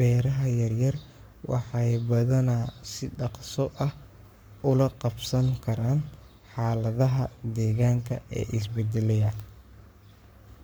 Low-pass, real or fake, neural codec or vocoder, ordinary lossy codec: none; fake; codec, 44.1 kHz, 7.8 kbps, Pupu-Codec; none